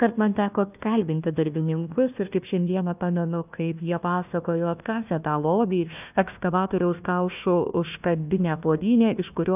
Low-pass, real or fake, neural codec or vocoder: 3.6 kHz; fake; codec, 16 kHz, 1 kbps, FunCodec, trained on Chinese and English, 50 frames a second